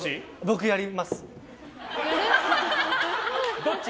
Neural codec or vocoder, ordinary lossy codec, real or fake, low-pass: none; none; real; none